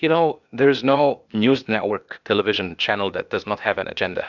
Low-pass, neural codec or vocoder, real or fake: 7.2 kHz; codec, 16 kHz, 0.8 kbps, ZipCodec; fake